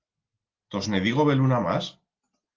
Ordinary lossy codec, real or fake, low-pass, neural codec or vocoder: Opus, 16 kbps; real; 7.2 kHz; none